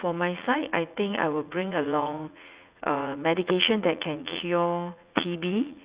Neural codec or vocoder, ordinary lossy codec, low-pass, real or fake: vocoder, 44.1 kHz, 80 mel bands, Vocos; Opus, 64 kbps; 3.6 kHz; fake